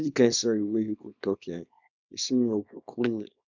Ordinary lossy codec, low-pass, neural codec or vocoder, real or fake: none; 7.2 kHz; codec, 24 kHz, 0.9 kbps, WavTokenizer, small release; fake